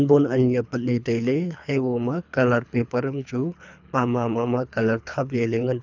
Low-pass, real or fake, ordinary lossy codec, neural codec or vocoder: 7.2 kHz; fake; none; codec, 24 kHz, 3 kbps, HILCodec